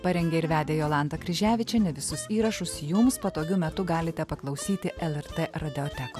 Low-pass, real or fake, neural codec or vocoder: 14.4 kHz; real; none